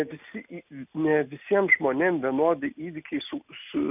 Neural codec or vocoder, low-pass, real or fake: none; 3.6 kHz; real